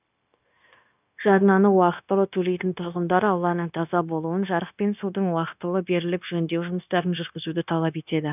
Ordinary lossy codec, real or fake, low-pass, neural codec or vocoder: none; fake; 3.6 kHz; codec, 16 kHz, 0.9 kbps, LongCat-Audio-Codec